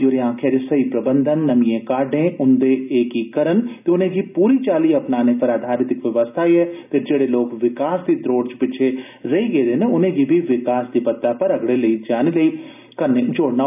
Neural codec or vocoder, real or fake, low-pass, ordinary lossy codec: none; real; 3.6 kHz; none